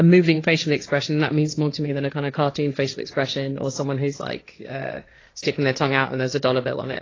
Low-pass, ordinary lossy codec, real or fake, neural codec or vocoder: 7.2 kHz; AAC, 32 kbps; fake; codec, 16 kHz, 1.1 kbps, Voila-Tokenizer